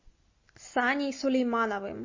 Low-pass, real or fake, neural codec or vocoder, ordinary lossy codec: 7.2 kHz; real; none; MP3, 32 kbps